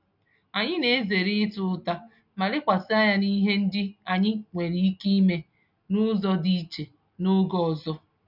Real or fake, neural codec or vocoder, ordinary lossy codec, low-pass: real; none; none; 5.4 kHz